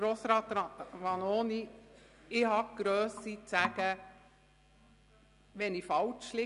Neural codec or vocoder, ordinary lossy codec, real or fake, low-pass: none; none; real; 10.8 kHz